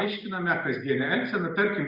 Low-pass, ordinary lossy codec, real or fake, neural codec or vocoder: 5.4 kHz; Opus, 64 kbps; real; none